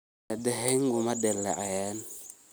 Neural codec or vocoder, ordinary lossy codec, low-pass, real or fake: none; none; none; real